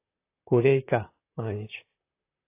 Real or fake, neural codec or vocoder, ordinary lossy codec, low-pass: fake; vocoder, 44.1 kHz, 128 mel bands, Pupu-Vocoder; AAC, 24 kbps; 3.6 kHz